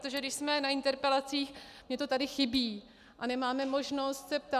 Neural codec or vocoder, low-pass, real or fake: none; 14.4 kHz; real